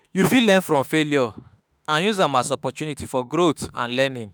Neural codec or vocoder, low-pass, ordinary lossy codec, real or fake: autoencoder, 48 kHz, 32 numbers a frame, DAC-VAE, trained on Japanese speech; none; none; fake